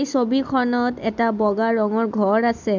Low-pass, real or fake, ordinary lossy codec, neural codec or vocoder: 7.2 kHz; real; MP3, 64 kbps; none